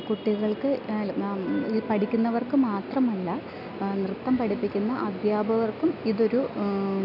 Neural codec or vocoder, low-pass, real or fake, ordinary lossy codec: none; 5.4 kHz; real; none